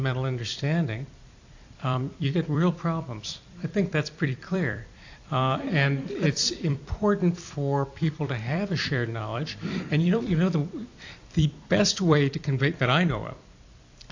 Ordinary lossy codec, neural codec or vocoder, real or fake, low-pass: AAC, 48 kbps; vocoder, 44.1 kHz, 128 mel bands every 256 samples, BigVGAN v2; fake; 7.2 kHz